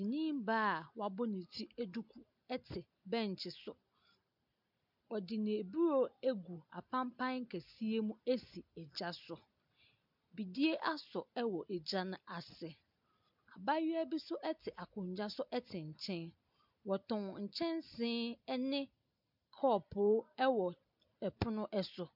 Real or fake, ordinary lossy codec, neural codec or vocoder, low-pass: real; MP3, 48 kbps; none; 5.4 kHz